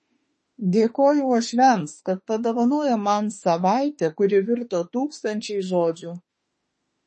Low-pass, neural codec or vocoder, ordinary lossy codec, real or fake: 10.8 kHz; autoencoder, 48 kHz, 32 numbers a frame, DAC-VAE, trained on Japanese speech; MP3, 32 kbps; fake